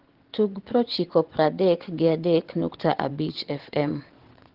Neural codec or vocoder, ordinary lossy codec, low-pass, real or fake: vocoder, 22.05 kHz, 80 mel bands, WaveNeXt; Opus, 16 kbps; 5.4 kHz; fake